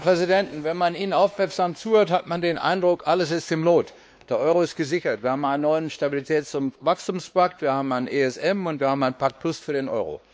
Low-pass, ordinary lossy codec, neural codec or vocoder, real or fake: none; none; codec, 16 kHz, 2 kbps, X-Codec, WavLM features, trained on Multilingual LibriSpeech; fake